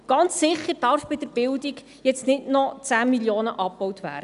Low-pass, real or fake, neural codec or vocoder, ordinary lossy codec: 10.8 kHz; real; none; none